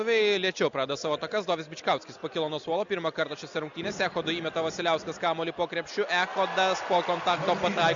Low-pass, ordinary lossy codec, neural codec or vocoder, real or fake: 7.2 kHz; Opus, 64 kbps; none; real